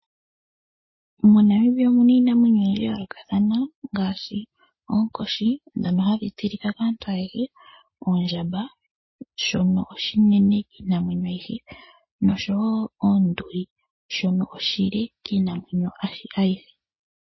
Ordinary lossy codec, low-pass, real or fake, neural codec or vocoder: MP3, 24 kbps; 7.2 kHz; real; none